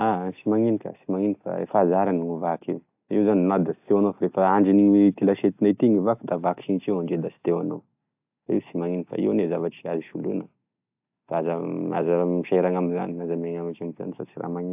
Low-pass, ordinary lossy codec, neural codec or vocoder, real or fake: 3.6 kHz; AAC, 32 kbps; none; real